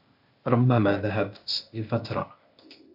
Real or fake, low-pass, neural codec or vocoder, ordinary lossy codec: fake; 5.4 kHz; codec, 16 kHz, 0.8 kbps, ZipCodec; MP3, 48 kbps